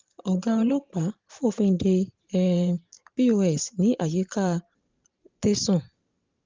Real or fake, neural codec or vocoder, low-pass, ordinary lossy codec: fake; vocoder, 44.1 kHz, 128 mel bands every 512 samples, BigVGAN v2; 7.2 kHz; Opus, 24 kbps